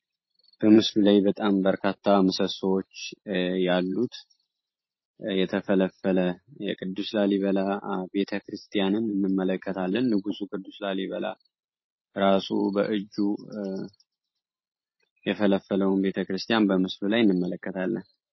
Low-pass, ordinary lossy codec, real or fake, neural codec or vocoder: 7.2 kHz; MP3, 24 kbps; fake; vocoder, 44.1 kHz, 128 mel bands every 512 samples, BigVGAN v2